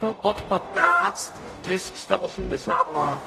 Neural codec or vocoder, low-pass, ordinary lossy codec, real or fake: codec, 44.1 kHz, 0.9 kbps, DAC; 14.4 kHz; AAC, 48 kbps; fake